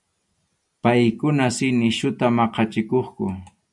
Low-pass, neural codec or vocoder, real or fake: 10.8 kHz; none; real